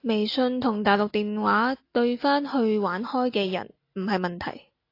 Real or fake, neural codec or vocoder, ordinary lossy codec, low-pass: real; none; AAC, 32 kbps; 5.4 kHz